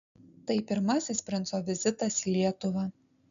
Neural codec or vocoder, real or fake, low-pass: none; real; 7.2 kHz